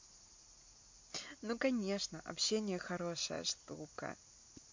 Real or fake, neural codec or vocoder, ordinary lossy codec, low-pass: real; none; MP3, 48 kbps; 7.2 kHz